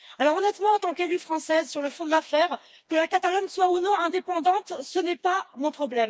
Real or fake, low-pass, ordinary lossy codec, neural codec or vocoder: fake; none; none; codec, 16 kHz, 2 kbps, FreqCodec, smaller model